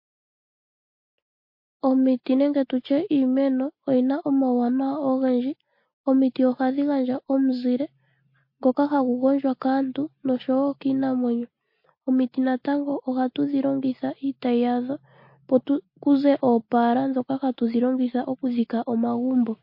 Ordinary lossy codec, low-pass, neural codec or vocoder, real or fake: MP3, 32 kbps; 5.4 kHz; none; real